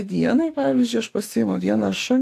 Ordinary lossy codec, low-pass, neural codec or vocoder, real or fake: AAC, 64 kbps; 14.4 kHz; autoencoder, 48 kHz, 32 numbers a frame, DAC-VAE, trained on Japanese speech; fake